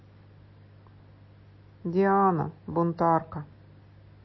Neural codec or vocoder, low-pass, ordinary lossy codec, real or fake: none; 7.2 kHz; MP3, 24 kbps; real